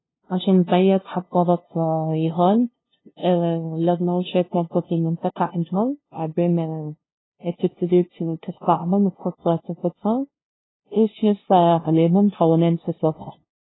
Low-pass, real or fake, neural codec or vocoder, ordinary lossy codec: 7.2 kHz; fake; codec, 16 kHz, 0.5 kbps, FunCodec, trained on LibriTTS, 25 frames a second; AAC, 16 kbps